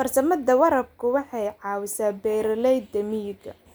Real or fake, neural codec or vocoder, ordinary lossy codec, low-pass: real; none; none; none